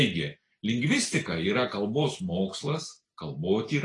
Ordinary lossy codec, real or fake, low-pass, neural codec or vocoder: AAC, 32 kbps; real; 10.8 kHz; none